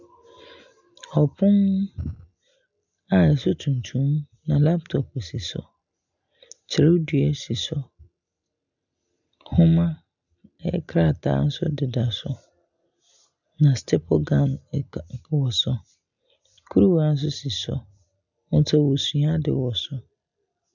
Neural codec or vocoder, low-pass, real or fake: none; 7.2 kHz; real